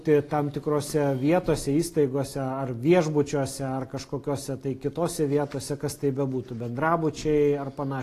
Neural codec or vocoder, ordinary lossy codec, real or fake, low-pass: none; AAC, 48 kbps; real; 14.4 kHz